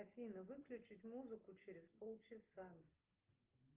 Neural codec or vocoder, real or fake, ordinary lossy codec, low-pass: none; real; Opus, 32 kbps; 3.6 kHz